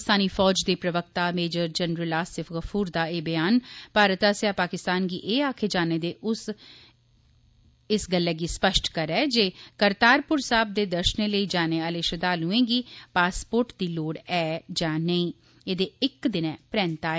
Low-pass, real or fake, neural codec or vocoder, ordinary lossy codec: none; real; none; none